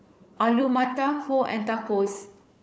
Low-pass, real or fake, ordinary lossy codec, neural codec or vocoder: none; fake; none; codec, 16 kHz, 4 kbps, FunCodec, trained on Chinese and English, 50 frames a second